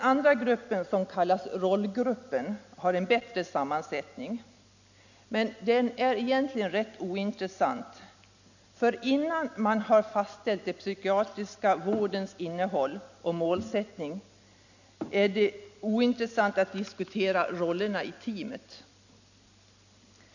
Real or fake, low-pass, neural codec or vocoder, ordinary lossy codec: real; 7.2 kHz; none; none